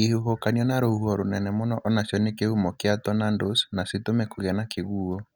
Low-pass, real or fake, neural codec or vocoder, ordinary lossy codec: none; real; none; none